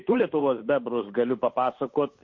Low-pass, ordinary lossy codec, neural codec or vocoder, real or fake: 7.2 kHz; MP3, 32 kbps; codec, 16 kHz, 8 kbps, FunCodec, trained on Chinese and English, 25 frames a second; fake